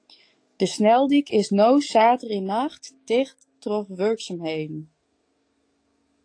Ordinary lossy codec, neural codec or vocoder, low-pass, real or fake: AAC, 48 kbps; codec, 44.1 kHz, 7.8 kbps, DAC; 9.9 kHz; fake